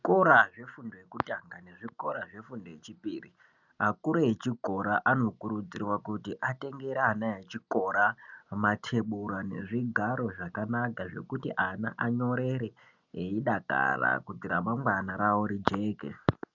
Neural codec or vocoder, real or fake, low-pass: none; real; 7.2 kHz